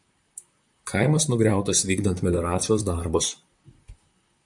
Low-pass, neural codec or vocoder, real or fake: 10.8 kHz; vocoder, 44.1 kHz, 128 mel bands, Pupu-Vocoder; fake